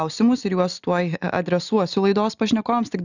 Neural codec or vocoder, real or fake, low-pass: none; real; 7.2 kHz